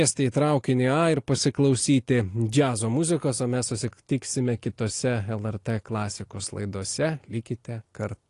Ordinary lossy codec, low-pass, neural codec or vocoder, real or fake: AAC, 48 kbps; 10.8 kHz; none; real